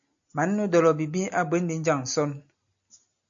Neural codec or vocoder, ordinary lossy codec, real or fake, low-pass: none; AAC, 64 kbps; real; 7.2 kHz